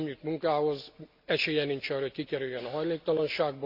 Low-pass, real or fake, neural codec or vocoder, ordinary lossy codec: 5.4 kHz; real; none; none